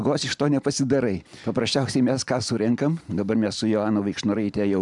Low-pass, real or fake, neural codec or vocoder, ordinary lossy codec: 10.8 kHz; real; none; MP3, 96 kbps